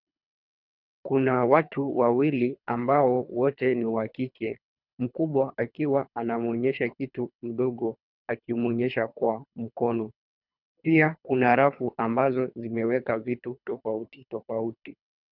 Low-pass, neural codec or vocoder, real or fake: 5.4 kHz; codec, 24 kHz, 3 kbps, HILCodec; fake